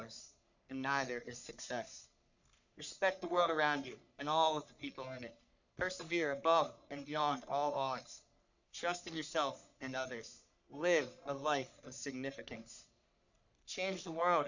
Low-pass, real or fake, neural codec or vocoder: 7.2 kHz; fake; codec, 44.1 kHz, 3.4 kbps, Pupu-Codec